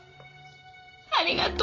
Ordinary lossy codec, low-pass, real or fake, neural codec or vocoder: AAC, 48 kbps; 7.2 kHz; fake; vocoder, 44.1 kHz, 128 mel bands every 512 samples, BigVGAN v2